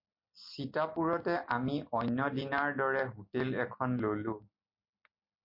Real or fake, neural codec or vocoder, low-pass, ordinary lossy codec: real; none; 5.4 kHz; MP3, 32 kbps